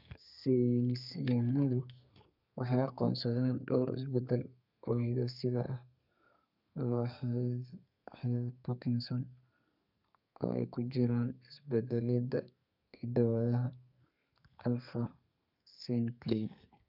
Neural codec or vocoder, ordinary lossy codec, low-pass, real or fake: codec, 32 kHz, 1.9 kbps, SNAC; none; 5.4 kHz; fake